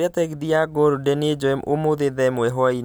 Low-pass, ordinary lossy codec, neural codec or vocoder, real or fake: none; none; none; real